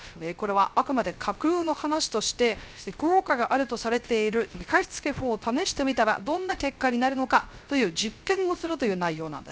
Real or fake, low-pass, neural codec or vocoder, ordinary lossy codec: fake; none; codec, 16 kHz, 0.3 kbps, FocalCodec; none